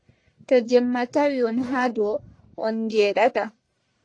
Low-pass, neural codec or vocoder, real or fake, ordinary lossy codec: 9.9 kHz; codec, 44.1 kHz, 1.7 kbps, Pupu-Codec; fake; AAC, 48 kbps